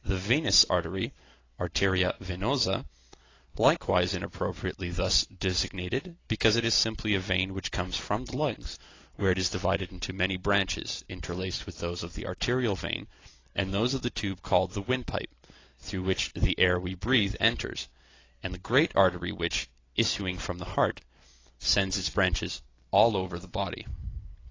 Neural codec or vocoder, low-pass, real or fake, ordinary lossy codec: none; 7.2 kHz; real; AAC, 32 kbps